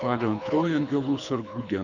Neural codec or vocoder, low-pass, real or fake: codec, 24 kHz, 6 kbps, HILCodec; 7.2 kHz; fake